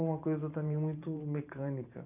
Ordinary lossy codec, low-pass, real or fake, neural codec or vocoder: none; 3.6 kHz; fake; vocoder, 44.1 kHz, 128 mel bands every 512 samples, BigVGAN v2